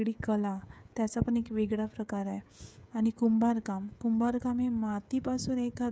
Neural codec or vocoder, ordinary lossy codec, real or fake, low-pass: codec, 16 kHz, 16 kbps, FreqCodec, smaller model; none; fake; none